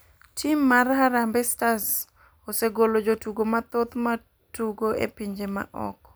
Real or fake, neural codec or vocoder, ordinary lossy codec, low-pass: real; none; none; none